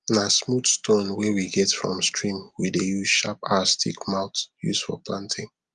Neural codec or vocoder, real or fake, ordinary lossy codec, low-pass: none; real; Opus, 32 kbps; 10.8 kHz